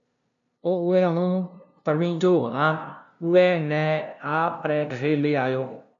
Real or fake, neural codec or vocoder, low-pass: fake; codec, 16 kHz, 0.5 kbps, FunCodec, trained on LibriTTS, 25 frames a second; 7.2 kHz